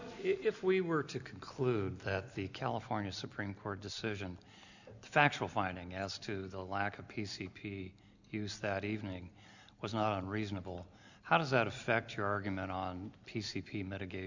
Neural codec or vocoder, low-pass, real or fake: none; 7.2 kHz; real